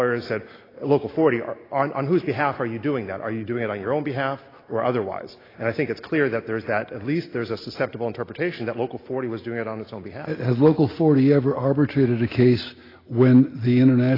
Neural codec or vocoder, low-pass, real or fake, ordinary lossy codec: none; 5.4 kHz; real; AAC, 24 kbps